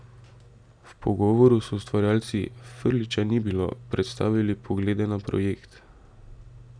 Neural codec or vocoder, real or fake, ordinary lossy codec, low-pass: none; real; none; 9.9 kHz